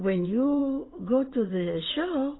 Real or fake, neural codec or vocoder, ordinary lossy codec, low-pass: fake; vocoder, 22.05 kHz, 80 mel bands, WaveNeXt; AAC, 16 kbps; 7.2 kHz